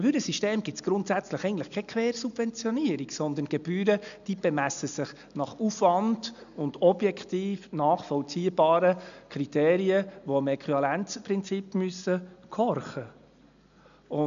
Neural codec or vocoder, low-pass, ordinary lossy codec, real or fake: none; 7.2 kHz; none; real